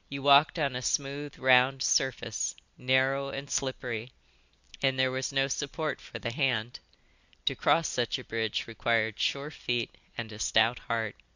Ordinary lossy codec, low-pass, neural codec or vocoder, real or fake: Opus, 64 kbps; 7.2 kHz; none; real